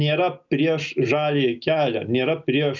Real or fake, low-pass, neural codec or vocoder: real; 7.2 kHz; none